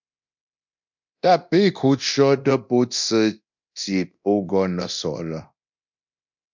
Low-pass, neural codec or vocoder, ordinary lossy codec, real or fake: 7.2 kHz; codec, 24 kHz, 0.9 kbps, DualCodec; MP3, 64 kbps; fake